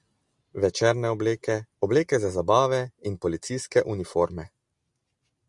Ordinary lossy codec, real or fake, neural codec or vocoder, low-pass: Opus, 64 kbps; real; none; 10.8 kHz